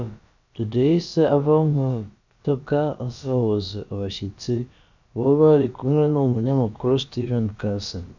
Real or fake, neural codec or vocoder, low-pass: fake; codec, 16 kHz, about 1 kbps, DyCAST, with the encoder's durations; 7.2 kHz